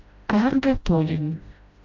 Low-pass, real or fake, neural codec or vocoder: 7.2 kHz; fake; codec, 16 kHz, 0.5 kbps, FreqCodec, smaller model